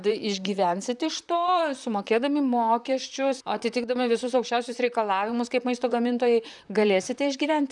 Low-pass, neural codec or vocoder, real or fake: 10.8 kHz; vocoder, 44.1 kHz, 128 mel bands, Pupu-Vocoder; fake